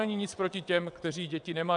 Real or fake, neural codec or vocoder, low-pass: fake; vocoder, 22.05 kHz, 80 mel bands, WaveNeXt; 9.9 kHz